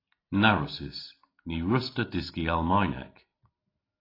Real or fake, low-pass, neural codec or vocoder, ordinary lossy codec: real; 5.4 kHz; none; AAC, 24 kbps